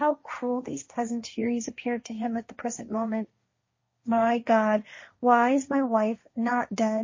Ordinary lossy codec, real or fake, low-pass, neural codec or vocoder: MP3, 32 kbps; fake; 7.2 kHz; codec, 16 kHz, 1.1 kbps, Voila-Tokenizer